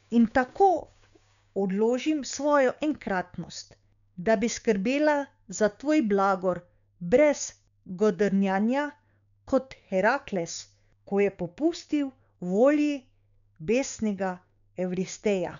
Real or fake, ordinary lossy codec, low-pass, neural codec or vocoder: fake; none; 7.2 kHz; codec, 16 kHz, 6 kbps, DAC